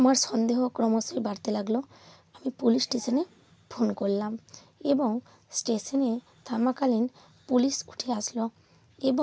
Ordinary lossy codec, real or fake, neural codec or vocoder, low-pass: none; real; none; none